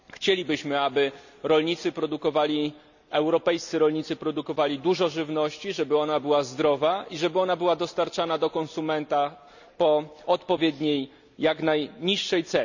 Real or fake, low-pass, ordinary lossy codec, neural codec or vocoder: real; 7.2 kHz; none; none